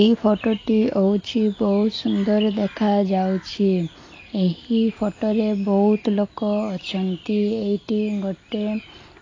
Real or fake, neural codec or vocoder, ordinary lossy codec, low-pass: real; none; AAC, 32 kbps; 7.2 kHz